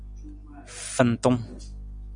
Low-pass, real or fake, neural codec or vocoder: 9.9 kHz; real; none